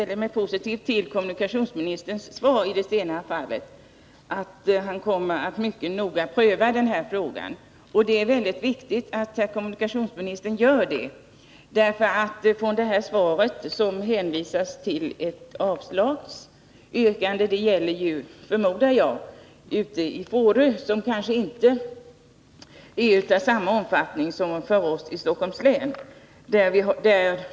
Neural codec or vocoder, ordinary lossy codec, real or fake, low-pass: none; none; real; none